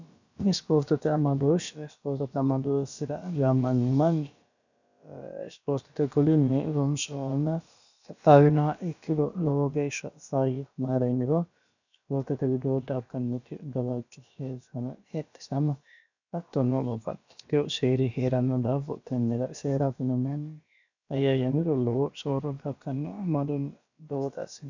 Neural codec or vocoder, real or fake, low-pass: codec, 16 kHz, about 1 kbps, DyCAST, with the encoder's durations; fake; 7.2 kHz